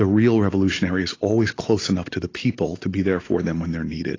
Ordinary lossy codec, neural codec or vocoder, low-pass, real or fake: AAC, 32 kbps; none; 7.2 kHz; real